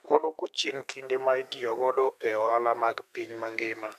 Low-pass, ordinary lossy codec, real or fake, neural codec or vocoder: 14.4 kHz; none; fake; codec, 32 kHz, 1.9 kbps, SNAC